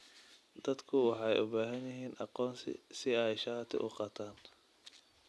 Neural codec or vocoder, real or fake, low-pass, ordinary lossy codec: none; real; none; none